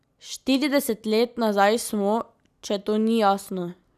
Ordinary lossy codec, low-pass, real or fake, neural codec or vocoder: none; 14.4 kHz; real; none